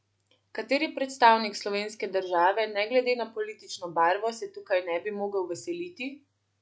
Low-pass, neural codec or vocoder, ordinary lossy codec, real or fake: none; none; none; real